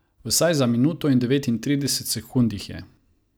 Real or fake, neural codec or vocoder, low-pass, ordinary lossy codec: real; none; none; none